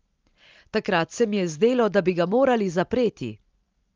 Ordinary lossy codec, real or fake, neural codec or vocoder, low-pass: Opus, 32 kbps; real; none; 7.2 kHz